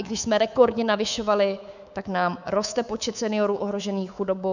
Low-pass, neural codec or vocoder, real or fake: 7.2 kHz; codec, 24 kHz, 3.1 kbps, DualCodec; fake